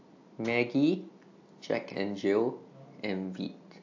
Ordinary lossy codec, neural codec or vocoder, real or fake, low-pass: AAC, 48 kbps; none; real; 7.2 kHz